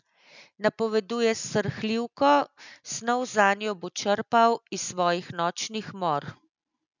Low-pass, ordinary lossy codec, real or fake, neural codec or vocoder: 7.2 kHz; none; real; none